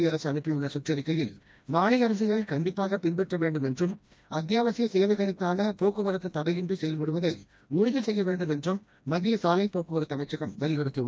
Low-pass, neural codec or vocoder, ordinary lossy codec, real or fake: none; codec, 16 kHz, 1 kbps, FreqCodec, smaller model; none; fake